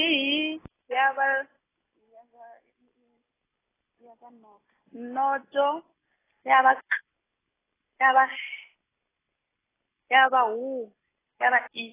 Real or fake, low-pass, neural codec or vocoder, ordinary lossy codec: real; 3.6 kHz; none; AAC, 16 kbps